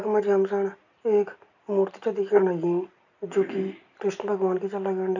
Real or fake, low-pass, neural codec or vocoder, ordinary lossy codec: real; 7.2 kHz; none; none